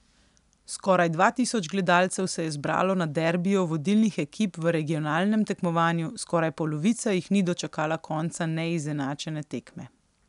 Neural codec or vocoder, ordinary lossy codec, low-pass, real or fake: none; none; 10.8 kHz; real